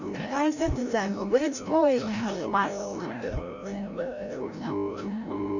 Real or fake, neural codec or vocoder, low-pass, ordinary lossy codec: fake; codec, 16 kHz, 0.5 kbps, FreqCodec, larger model; 7.2 kHz; none